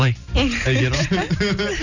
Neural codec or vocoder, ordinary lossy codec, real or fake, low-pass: none; none; real; 7.2 kHz